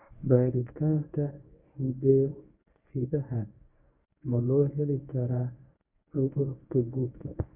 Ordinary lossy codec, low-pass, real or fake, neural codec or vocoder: none; 3.6 kHz; fake; codec, 24 kHz, 0.9 kbps, WavTokenizer, medium speech release version 1